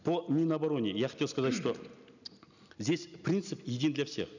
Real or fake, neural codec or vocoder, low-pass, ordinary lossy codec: real; none; 7.2 kHz; none